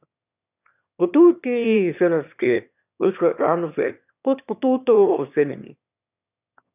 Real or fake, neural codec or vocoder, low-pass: fake; autoencoder, 22.05 kHz, a latent of 192 numbers a frame, VITS, trained on one speaker; 3.6 kHz